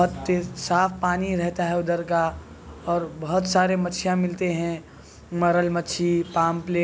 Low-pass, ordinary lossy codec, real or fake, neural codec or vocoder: none; none; real; none